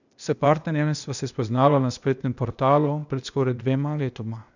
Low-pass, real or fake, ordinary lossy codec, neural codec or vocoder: 7.2 kHz; fake; none; codec, 16 kHz, 0.8 kbps, ZipCodec